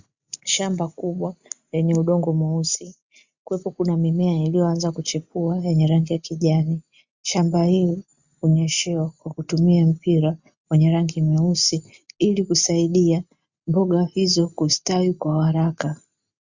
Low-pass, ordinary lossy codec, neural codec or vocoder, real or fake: 7.2 kHz; Opus, 64 kbps; none; real